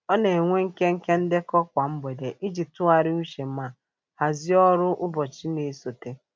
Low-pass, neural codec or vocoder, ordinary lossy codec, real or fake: none; none; none; real